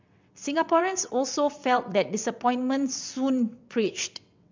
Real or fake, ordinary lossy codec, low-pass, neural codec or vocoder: fake; MP3, 64 kbps; 7.2 kHz; vocoder, 22.05 kHz, 80 mel bands, WaveNeXt